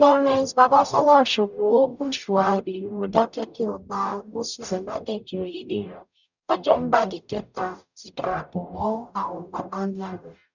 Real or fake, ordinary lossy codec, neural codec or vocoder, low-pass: fake; none; codec, 44.1 kHz, 0.9 kbps, DAC; 7.2 kHz